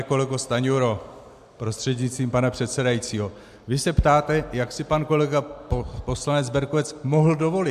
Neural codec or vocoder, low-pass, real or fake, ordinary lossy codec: none; 14.4 kHz; real; AAC, 96 kbps